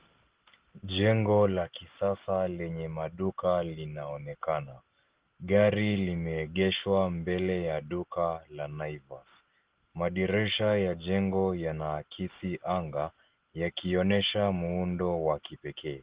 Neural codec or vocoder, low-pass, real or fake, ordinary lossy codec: none; 3.6 kHz; real; Opus, 16 kbps